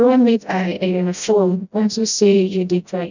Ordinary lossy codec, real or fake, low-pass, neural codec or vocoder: none; fake; 7.2 kHz; codec, 16 kHz, 0.5 kbps, FreqCodec, smaller model